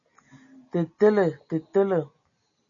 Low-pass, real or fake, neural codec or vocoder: 7.2 kHz; real; none